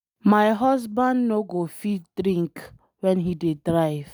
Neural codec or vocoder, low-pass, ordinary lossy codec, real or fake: none; none; none; real